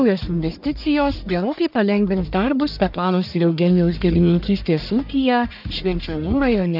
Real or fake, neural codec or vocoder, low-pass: fake; codec, 44.1 kHz, 1.7 kbps, Pupu-Codec; 5.4 kHz